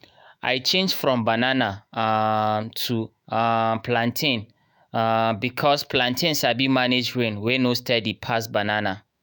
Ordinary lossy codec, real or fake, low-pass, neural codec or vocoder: none; fake; none; autoencoder, 48 kHz, 128 numbers a frame, DAC-VAE, trained on Japanese speech